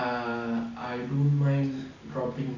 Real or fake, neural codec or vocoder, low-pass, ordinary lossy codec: real; none; 7.2 kHz; none